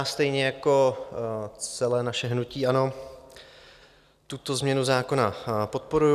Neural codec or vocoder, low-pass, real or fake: none; 14.4 kHz; real